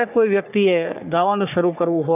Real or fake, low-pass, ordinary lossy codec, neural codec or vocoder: fake; 3.6 kHz; none; codec, 44.1 kHz, 3.4 kbps, Pupu-Codec